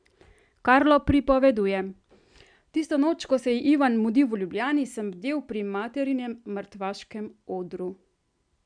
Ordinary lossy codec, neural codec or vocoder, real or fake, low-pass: MP3, 96 kbps; none; real; 9.9 kHz